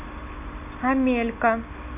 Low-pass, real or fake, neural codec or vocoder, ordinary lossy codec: 3.6 kHz; real; none; none